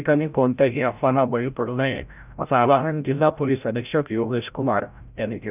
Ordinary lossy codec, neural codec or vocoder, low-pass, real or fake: none; codec, 16 kHz, 0.5 kbps, FreqCodec, larger model; 3.6 kHz; fake